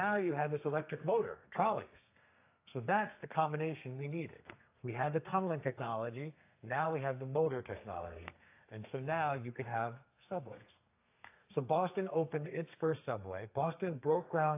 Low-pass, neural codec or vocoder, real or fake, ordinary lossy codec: 3.6 kHz; codec, 32 kHz, 1.9 kbps, SNAC; fake; AAC, 24 kbps